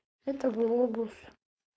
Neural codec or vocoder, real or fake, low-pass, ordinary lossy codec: codec, 16 kHz, 4.8 kbps, FACodec; fake; none; none